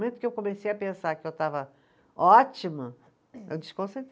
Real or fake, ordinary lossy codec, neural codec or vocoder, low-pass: real; none; none; none